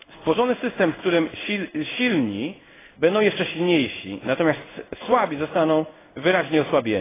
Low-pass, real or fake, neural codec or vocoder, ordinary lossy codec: 3.6 kHz; fake; codec, 16 kHz in and 24 kHz out, 1 kbps, XY-Tokenizer; AAC, 16 kbps